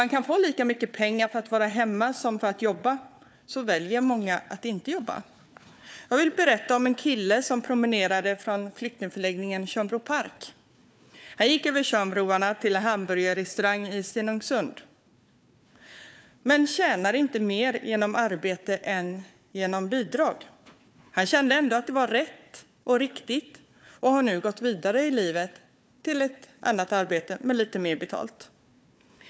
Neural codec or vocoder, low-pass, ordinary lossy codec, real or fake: codec, 16 kHz, 4 kbps, FunCodec, trained on Chinese and English, 50 frames a second; none; none; fake